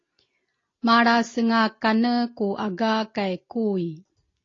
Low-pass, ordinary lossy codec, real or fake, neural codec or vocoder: 7.2 kHz; AAC, 32 kbps; real; none